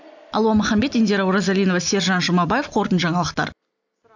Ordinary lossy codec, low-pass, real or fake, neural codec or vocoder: none; 7.2 kHz; real; none